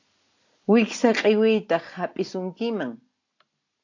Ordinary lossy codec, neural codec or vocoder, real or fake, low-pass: AAC, 48 kbps; none; real; 7.2 kHz